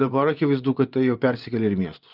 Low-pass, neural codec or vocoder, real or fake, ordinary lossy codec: 5.4 kHz; vocoder, 24 kHz, 100 mel bands, Vocos; fake; Opus, 24 kbps